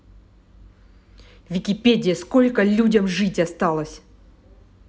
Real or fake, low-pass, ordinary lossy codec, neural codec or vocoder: real; none; none; none